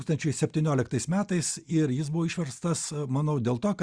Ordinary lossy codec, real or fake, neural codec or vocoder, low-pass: Opus, 64 kbps; real; none; 9.9 kHz